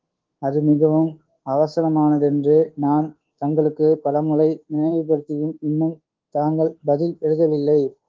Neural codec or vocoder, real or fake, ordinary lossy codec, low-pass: codec, 24 kHz, 1.2 kbps, DualCodec; fake; Opus, 16 kbps; 7.2 kHz